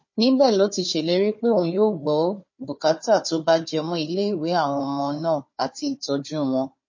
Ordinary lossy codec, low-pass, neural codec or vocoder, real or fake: MP3, 32 kbps; 7.2 kHz; codec, 16 kHz, 4 kbps, FunCodec, trained on Chinese and English, 50 frames a second; fake